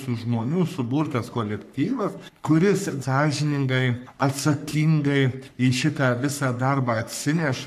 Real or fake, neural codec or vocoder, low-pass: fake; codec, 44.1 kHz, 3.4 kbps, Pupu-Codec; 14.4 kHz